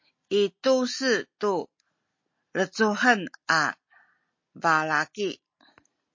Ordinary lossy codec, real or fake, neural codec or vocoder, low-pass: MP3, 32 kbps; real; none; 7.2 kHz